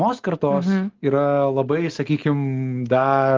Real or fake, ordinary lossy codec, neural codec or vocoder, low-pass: real; Opus, 16 kbps; none; 7.2 kHz